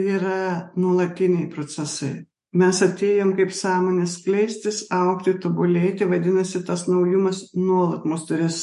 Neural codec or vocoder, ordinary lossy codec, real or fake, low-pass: autoencoder, 48 kHz, 128 numbers a frame, DAC-VAE, trained on Japanese speech; MP3, 48 kbps; fake; 14.4 kHz